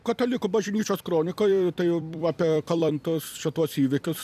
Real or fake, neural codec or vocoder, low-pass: fake; vocoder, 44.1 kHz, 128 mel bands every 256 samples, BigVGAN v2; 14.4 kHz